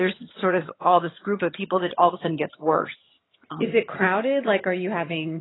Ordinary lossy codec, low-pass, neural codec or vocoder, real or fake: AAC, 16 kbps; 7.2 kHz; vocoder, 22.05 kHz, 80 mel bands, HiFi-GAN; fake